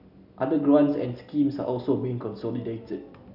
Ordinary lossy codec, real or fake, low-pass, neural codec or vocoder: none; real; 5.4 kHz; none